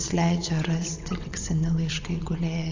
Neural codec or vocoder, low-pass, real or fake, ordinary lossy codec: vocoder, 22.05 kHz, 80 mel bands, WaveNeXt; 7.2 kHz; fake; AAC, 48 kbps